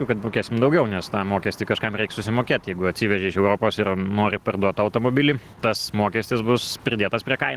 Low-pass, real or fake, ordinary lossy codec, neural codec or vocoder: 14.4 kHz; real; Opus, 16 kbps; none